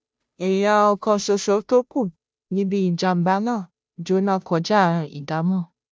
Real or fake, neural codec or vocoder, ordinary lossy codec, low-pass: fake; codec, 16 kHz, 0.5 kbps, FunCodec, trained on Chinese and English, 25 frames a second; none; none